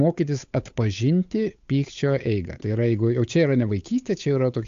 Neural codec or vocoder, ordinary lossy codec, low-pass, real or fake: codec, 16 kHz, 8 kbps, FunCodec, trained on Chinese and English, 25 frames a second; AAC, 64 kbps; 7.2 kHz; fake